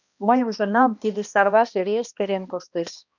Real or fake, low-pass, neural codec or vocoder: fake; 7.2 kHz; codec, 16 kHz, 1 kbps, X-Codec, HuBERT features, trained on balanced general audio